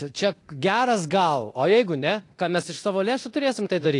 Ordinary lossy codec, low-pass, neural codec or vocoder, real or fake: AAC, 48 kbps; 10.8 kHz; codec, 24 kHz, 0.9 kbps, DualCodec; fake